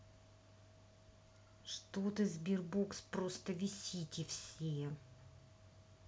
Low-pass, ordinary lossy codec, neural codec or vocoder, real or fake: none; none; none; real